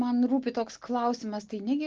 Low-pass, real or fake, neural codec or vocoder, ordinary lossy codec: 7.2 kHz; real; none; Opus, 16 kbps